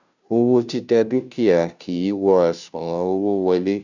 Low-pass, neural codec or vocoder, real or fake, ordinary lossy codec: 7.2 kHz; codec, 16 kHz, 0.5 kbps, FunCodec, trained on Chinese and English, 25 frames a second; fake; none